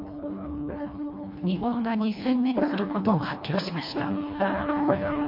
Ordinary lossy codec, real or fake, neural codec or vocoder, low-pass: MP3, 32 kbps; fake; codec, 24 kHz, 1.5 kbps, HILCodec; 5.4 kHz